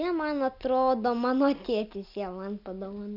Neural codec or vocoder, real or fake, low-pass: none; real; 5.4 kHz